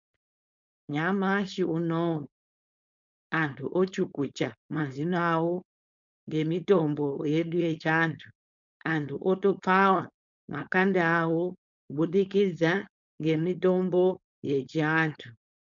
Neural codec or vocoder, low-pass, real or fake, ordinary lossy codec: codec, 16 kHz, 4.8 kbps, FACodec; 7.2 kHz; fake; MP3, 64 kbps